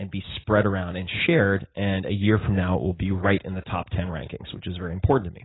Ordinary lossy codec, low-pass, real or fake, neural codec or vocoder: AAC, 16 kbps; 7.2 kHz; real; none